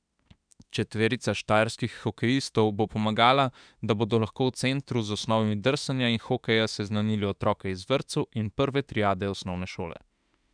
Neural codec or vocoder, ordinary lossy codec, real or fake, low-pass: autoencoder, 48 kHz, 32 numbers a frame, DAC-VAE, trained on Japanese speech; none; fake; 9.9 kHz